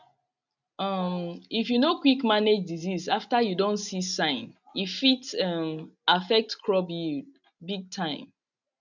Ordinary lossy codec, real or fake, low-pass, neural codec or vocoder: none; real; 7.2 kHz; none